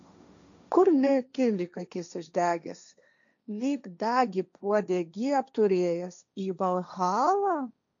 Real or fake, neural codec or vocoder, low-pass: fake; codec, 16 kHz, 1.1 kbps, Voila-Tokenizer; 7.2 kHz